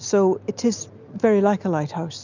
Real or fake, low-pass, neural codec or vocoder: real; 7.2 kHz; none